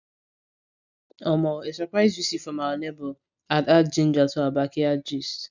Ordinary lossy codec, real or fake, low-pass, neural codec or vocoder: none; real; 7.2 kHz; none